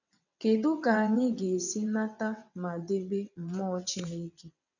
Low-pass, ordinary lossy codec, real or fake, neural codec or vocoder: 7.2 kHz; none; fake; vocoder, 22.05 kHz, 80 mel bands, WaveNeXt